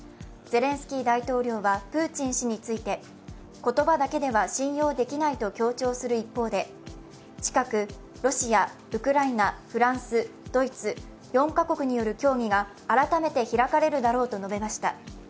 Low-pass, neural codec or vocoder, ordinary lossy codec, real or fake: none; none; none; real